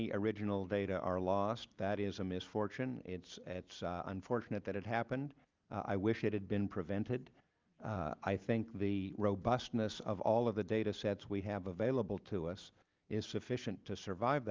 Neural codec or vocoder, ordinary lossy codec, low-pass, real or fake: none; Opus, 24 kbps; 7.2 kHz; real